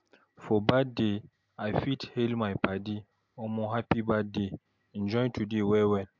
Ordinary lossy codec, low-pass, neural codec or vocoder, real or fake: MP3, 64 kbps; 7.2 kHz; none; real